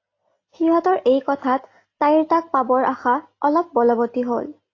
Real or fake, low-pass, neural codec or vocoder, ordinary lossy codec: real; 7.2 kHz; none; AAC, 32 kbps